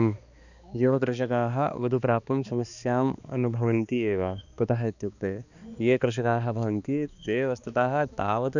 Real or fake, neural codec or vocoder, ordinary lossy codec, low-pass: fake; codec, 16 kHz, 2 kbps, X-Codec, HuBERT features, trained on balanced general audio; none; 7.2 kHz